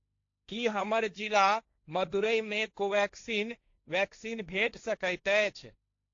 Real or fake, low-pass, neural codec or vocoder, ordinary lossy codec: fake; 7.2 kHz; codec, 16 kHz, 1.1 kbps, Voila-Tokenizer; AAC, 48 kbps